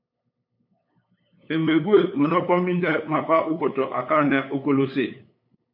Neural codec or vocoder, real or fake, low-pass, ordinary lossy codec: codec, 16 kHz, 8 kbps, FunCodec, trained on LibriTTS, 25 frames a second; fake; 5.4 kHz; MP3, 32 kbps